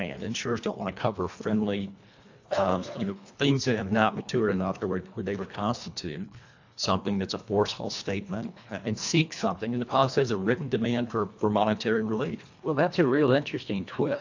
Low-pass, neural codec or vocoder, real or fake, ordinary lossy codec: 7.2 kHz; codec, 24 kHz, 1.5 kbps, HILCodec; fake; AAC, 48 kbps